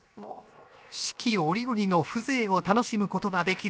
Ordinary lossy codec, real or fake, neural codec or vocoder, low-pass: none; fake; codec, 16 kHz, 0.7 kbps, FocalCodec; none